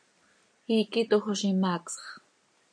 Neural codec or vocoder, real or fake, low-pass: none; real; 9.9 kHz